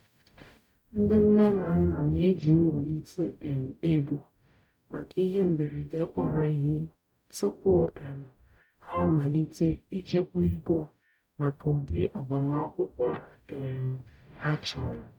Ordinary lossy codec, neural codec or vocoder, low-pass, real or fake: none; codec, 44.1 kHz, 0.9 kbps, DAC; 19.8 kHz; fake